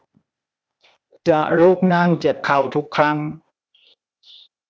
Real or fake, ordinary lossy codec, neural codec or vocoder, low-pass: fake; none; codec, 16 kHz, 0.8 kbps, ZipCodec; none